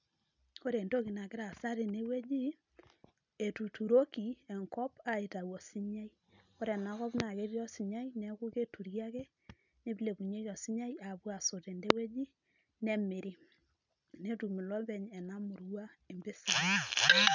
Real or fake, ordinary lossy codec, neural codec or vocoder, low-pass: real; none; none; 7.2 kHz